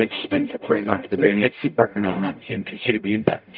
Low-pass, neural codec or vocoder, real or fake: 5.4 kHz; codec, 44.1 kHz, 0.9 kbps, DAC; fake